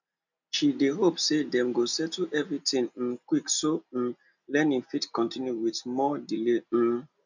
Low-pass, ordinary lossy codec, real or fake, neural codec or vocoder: 7.2 kHz; none; real; none